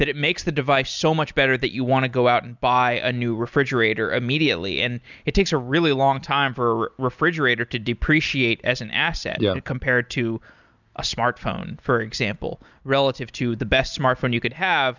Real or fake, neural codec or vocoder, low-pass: real; none; 7.2 kHz